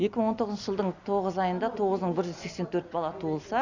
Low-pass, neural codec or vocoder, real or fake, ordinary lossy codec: 7.2 kHz; none; real; none